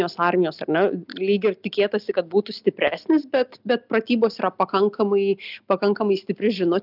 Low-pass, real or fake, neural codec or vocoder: 5.4 kHz; real; none